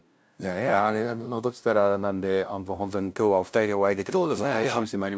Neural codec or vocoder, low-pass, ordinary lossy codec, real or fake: codec, 16 kHz, 0.5 kbps, FunCodec, trained on LibriTTS, 25 frames a second; none; none; fake